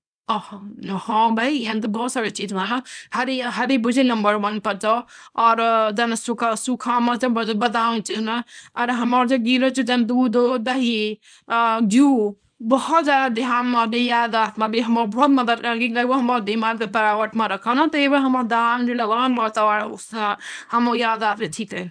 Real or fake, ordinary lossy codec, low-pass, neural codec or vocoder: fake; none; 9.9 kHz; codec, 24 kHz, 0.9 kbps, WavTokenizer, small release